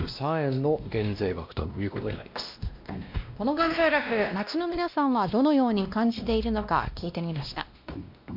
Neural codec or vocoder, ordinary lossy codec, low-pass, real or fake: codec, 16 kHz, 1 kbps, X-Codec, WavLM features, trained on Multilingual LibriSpeech; MP3, 48 kbps; 5.4 kHz; fake